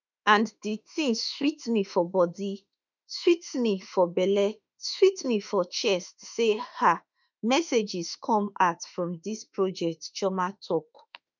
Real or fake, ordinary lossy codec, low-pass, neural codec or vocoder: fake; none; 7.2 kHz; autoencoder, 48 kHz, 32 numbers a frame, DAC-VAE, trained on Japanese speech